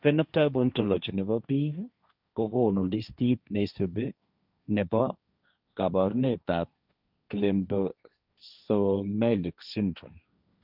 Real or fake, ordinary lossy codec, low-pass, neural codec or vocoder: fake; none; 5.4 kHz; codec, 16 kHz, 1.1 kbps, Voila-Tokenizer